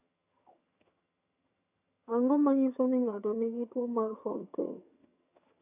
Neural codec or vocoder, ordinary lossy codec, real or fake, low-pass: vocoder, 22.05 kHz, 80 mel bands, HiFi-GAN; none; fake; 3.6 kHz